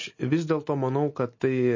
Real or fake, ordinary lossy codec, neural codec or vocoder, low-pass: real; MP3, 32 kbps; none; 7.2 kHz